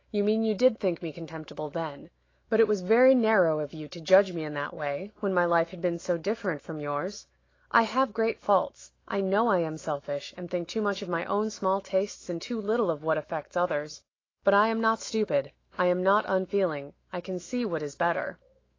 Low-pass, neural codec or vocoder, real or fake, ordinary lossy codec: 7.2 kHz; autoencoder, 48 kHz, 128 numbers a frame, DAC-VAE, trained on Japanese speech; fake; AAC, 32 kbps